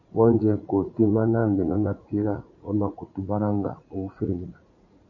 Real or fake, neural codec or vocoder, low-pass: fake; vocoder, 44.1 kHz, 80 mel bands, Vocos; 7.2 kHz